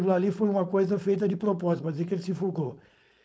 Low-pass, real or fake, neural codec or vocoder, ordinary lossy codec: none; fake; codec, 16 kHz, 4.8 kbps, FACodec; none